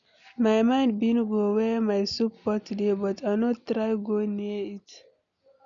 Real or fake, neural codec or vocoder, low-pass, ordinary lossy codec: real; none; 7.2 kHz; none